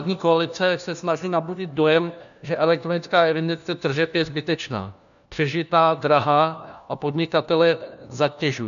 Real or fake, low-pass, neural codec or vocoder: fake; 7.2 kHz; codec, 16 kHz, 1 kbps, FunCodec, trained on LibriTTS, 50 frames a second